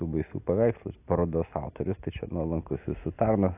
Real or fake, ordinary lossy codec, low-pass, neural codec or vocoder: real; AAC, 32 kbps; 3.6 kHz; none